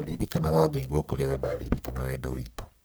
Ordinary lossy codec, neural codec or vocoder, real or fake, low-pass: none; codec, 44.1 kHz, 1.7 kbps, Pupu-Codec; fake; none